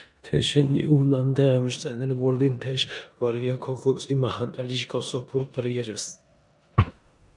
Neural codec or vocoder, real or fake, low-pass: codec, 16 kHz in and 24 kHz out, 0.9 kbps, LongCat-Audio-Codec, four codebook decoder; fake; 10.8 kHz